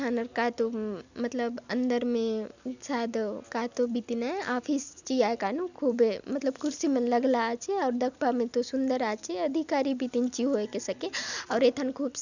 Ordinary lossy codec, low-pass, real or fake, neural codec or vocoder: none; 7.2 kHz; real; none